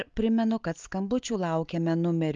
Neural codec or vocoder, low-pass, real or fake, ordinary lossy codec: none; 7.2 kHz; real; Opus, 32 kbps